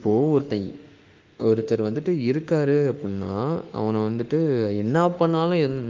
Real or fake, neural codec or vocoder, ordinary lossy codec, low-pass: fake; autoencoder, 48 kHz, 32 numbers a frame, DAC-VAE, trained on Japanese speech; Opus, 24 kbps; 7.2 kHz